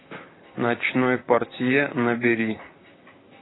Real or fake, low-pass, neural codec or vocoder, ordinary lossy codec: fake; 7.2 kHz; vocoder, 44.1 kHz, 128 mel bands, Pupu-Vocoder; AAC, 16 kbps